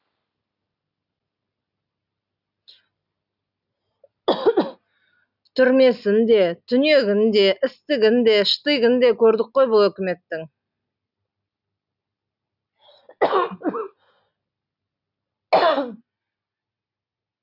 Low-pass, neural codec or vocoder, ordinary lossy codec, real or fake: 5.4 kHz; none; none; real